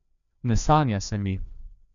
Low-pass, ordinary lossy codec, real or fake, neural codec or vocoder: 7.2 kHz; none; fake; codec, 16 kHz, 2 kbps, FreqCodec, larger model